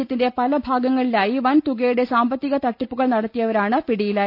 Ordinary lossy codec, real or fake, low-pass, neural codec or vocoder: none; real; 5.4 kHz; none